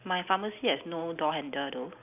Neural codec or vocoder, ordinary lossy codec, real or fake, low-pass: none; none; real; 3.6 kHz